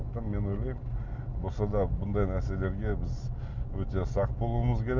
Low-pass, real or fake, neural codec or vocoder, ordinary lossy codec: 7.2 kHz; real; none; none